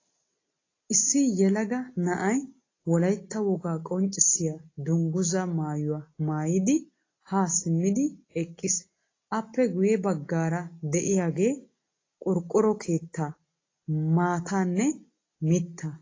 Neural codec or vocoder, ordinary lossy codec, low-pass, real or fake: none; AAC, 32 kbps; 7.2 kHz; real